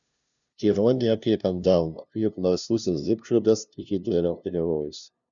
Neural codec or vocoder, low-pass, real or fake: codec, 16 kHz, 0.5 kbps, FunCodec, trained on LibriTTS, 25 frames a second; 7.2 kHz; fake